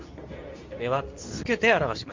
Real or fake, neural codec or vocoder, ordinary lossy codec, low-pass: fake; codec, 24 kHz, 0.9 kbps, WavTokenizer, medium speech release version 2; MP3, 64 kbps; 7.2 kHz